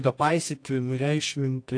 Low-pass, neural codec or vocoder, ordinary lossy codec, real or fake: 9.9 kHz; codec, 24 kHz, 0.9 kbps, WavTokenizer, medium music audio release; AAC, 48 kbps; fake